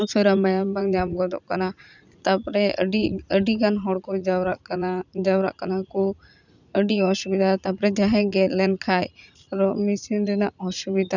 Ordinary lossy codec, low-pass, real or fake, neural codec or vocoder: none; 7.2 kHz; fake; vocoder, 44.1 kHz, 80 mel bands, Vocos